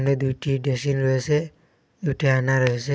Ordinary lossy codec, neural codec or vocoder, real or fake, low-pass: none; none; real; none